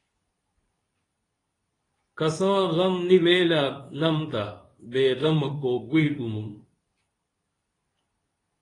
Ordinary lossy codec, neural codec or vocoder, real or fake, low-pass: AAC, 32 kbps; codec, 24 kHz, 0.9 kbps, WavTokenizer, medium speech release version 2; fake; 10.8 kHz